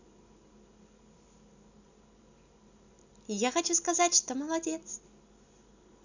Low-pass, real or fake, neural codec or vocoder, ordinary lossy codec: 7.2 kHz; real; none; none